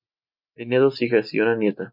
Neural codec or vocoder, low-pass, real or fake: none; 5.4 kHz; real